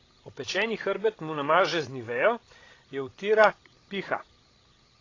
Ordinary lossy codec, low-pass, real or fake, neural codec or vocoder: AAC, 32 kbps; 7.2 kHz; fake; codec, 16 kHz, 16 kbps, FreqCodec, larger model